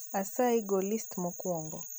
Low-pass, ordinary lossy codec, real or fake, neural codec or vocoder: none; none; real; none